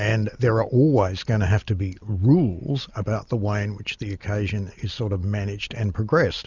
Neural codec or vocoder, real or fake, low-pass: none; real; 7.2 kHz